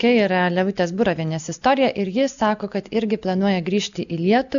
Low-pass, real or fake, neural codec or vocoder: 7.2 kHz; real; none